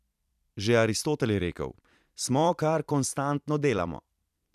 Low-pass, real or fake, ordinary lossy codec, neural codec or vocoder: 14.4 kHz; fake; none; vocoder, 44.1 kHz, 128 mel bands every 256 samples, BigVGAN v2